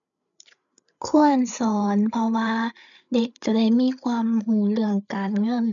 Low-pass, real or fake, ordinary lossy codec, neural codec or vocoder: 7.2 kHz; fake; none; codec, 16 kHz, 8 kbps, FreqCodec, larger model